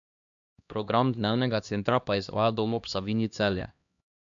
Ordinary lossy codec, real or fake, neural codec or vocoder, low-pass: MP3, 64 kbps; fake; codec, 16 kHz, 1 kbps, X-Codec, HuBERT features, trained on LibriSpeech; 7.2 kHz